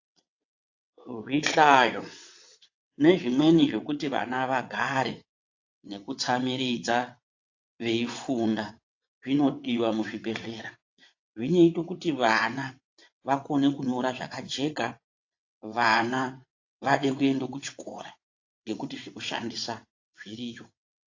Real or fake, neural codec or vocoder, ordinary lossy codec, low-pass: fake; vocoder, 22.05 kHz, 80 mel bands, WaveNeXt; AAC, 48 kbps; 7.2 kHz